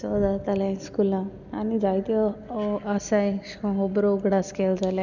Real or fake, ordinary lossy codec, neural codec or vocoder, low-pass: real; none; none; 7.2 kHz